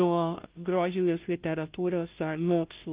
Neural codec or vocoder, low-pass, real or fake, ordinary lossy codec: codec, 16 kHz, 0.5 kbps, FunCodec, trained on Chinese and English, 25 frames a second; 3.6 kHz; fake; Opus, 32 kbps